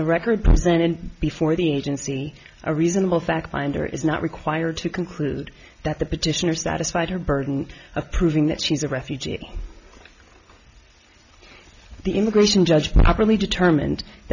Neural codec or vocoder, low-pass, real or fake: none; 7.2 kHz; real